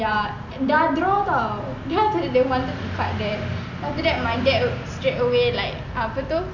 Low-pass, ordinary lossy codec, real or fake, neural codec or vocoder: 7.2 kHz; none; real; none